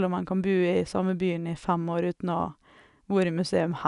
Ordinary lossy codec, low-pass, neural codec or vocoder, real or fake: none; 10.8 kHz; none; real